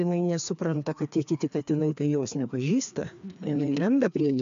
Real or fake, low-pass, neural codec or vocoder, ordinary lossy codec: fake; 7.2 kHz; codec, 16 kHz, 2 kbps, FreqCodec, larger model; MP3, 64 kbps